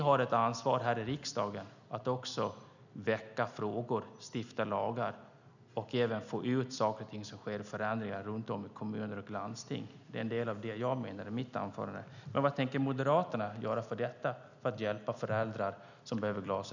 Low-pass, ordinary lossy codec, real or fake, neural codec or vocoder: 7.2 kHz; none; real; none